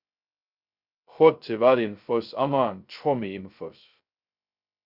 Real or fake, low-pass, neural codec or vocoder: fake; 5.4 kHz; codec, 16 kHz, 0.2 kbps, FocalCodec